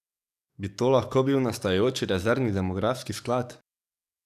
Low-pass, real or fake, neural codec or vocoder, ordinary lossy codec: 14.4 kHz; fake; codec, 44.1 kHz, 7.8 kbps, DAC; none